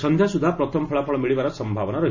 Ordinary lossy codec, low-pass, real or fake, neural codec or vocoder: none; 7.2 kHz; real; none